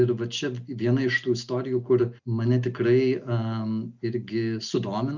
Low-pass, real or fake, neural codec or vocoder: 7.2 kHz; real; none